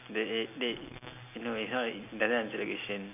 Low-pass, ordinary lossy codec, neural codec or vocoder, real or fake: 3.6 kHz; none; none; real